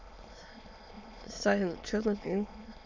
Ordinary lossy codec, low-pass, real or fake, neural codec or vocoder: MP3, 64 kbps; 7.2 kHz; fake; autoencoder, 22.05 kHz, a latent of 192 numbers a frame, VITS, trained on many speakers